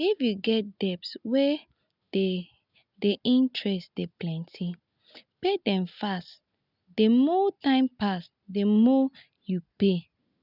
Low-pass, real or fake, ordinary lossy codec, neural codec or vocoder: 5.4 kHz; real; none; none